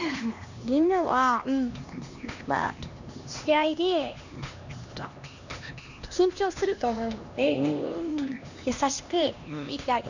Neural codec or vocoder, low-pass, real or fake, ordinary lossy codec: codec, 16 kHz, 2 kbps, X-Codec, HuBERT features, trained on LibriSpeech; 7.2 kHz; fake; none